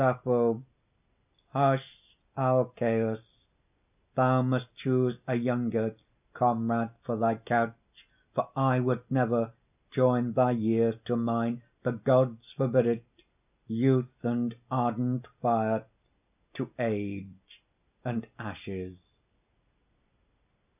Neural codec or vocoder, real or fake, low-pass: none; real; 3.6 kHz